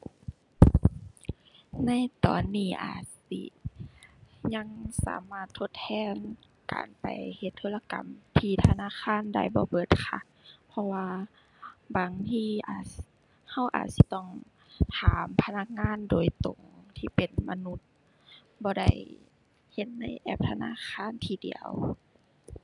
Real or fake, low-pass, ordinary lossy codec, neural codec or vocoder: real; 10.8 kHz; none; none